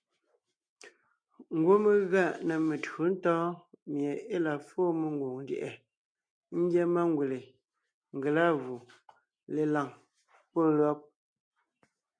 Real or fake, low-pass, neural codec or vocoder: real; 9.9 kHz; none